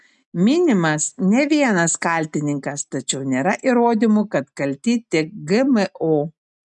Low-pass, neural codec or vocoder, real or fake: 10.8 kHz; none; real